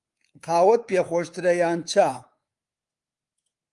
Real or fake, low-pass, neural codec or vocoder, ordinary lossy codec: fake; 10.8 kHz; codec, 24 kHz, 3.1 kbps, DualCodec; Opus, 32 kbps